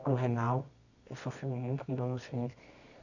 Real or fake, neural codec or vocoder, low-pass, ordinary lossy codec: fake; codec, 24 kHz, 0.9 kbps, WavTokenizer, medium music audio release; 7.2 kHz; none